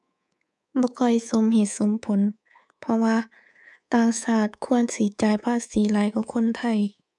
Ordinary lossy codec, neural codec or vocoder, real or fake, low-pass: none; codec, 24 kHz, 3.1 kbps, DualCodec; fake; 10.8 kHz